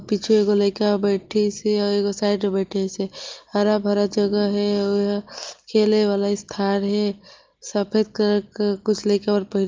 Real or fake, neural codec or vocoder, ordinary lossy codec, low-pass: real; none; Opus, 32 kbps; 7.2 kHz